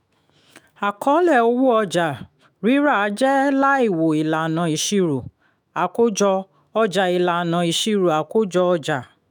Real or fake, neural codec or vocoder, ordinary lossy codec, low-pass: fake; autoencoder, 48 kHz, 128 numbers a frame, DAC-VAE, trained on Japanese speech; none; none